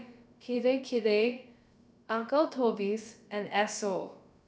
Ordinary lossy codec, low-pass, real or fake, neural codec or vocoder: none; none; fake; codec, 16 kHz, about 1 kbps, DyCAST, with the encoder's durations